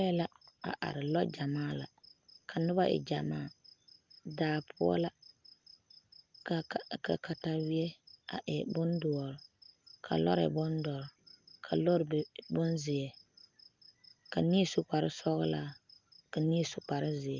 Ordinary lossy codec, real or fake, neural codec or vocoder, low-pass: Opus, 24 kbps; real; none; 7.2 kHz